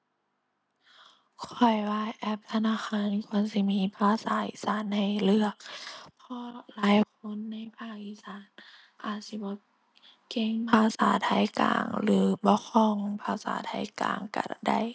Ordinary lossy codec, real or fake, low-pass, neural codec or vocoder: none; real; none; none